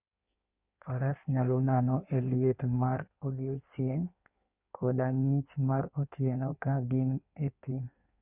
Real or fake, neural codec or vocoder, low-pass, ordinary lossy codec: fake; codec, 16 kHz in and 24 kHz out, 1.1 kbps, FireRedTTS-2 codec; 3.6 kHz; Opus, 64 kbps